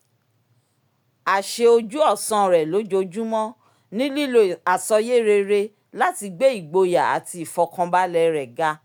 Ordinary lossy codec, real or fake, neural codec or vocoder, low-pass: none; real; none; none